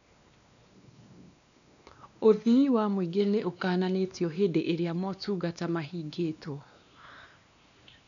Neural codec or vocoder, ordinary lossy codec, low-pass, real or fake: codec, 16 kHz, 2 kbps, X-Codec, WavLM features, trained on Multilingual LibriSpeech; none; 7.2 kHz; fake